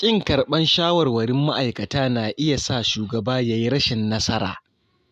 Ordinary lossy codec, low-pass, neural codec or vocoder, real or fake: none; 14.4 kHz; none; real